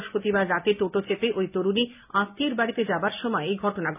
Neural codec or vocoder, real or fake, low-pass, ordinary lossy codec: none; real; 3.6 kHz; none